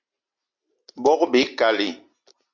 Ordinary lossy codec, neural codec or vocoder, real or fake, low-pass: MP3, 48 kbps; none; real; 7.2 kHz